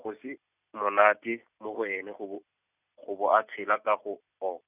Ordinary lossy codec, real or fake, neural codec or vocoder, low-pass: none; real; none; 3.6 kHz